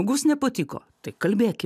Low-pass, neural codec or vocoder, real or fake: 14.4 kHz; none; real